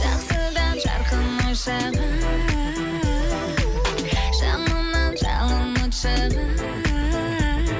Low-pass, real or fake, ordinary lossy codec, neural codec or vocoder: none; real; none; none